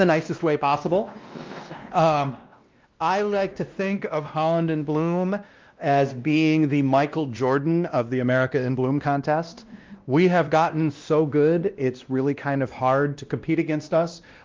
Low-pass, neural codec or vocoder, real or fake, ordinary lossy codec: 7.2 kHz; codec, 16 kHz, 1 kbps, X-Codec, WavLM features, trained on Multilingual LibriSpeech; fake; Opus, 24 kbps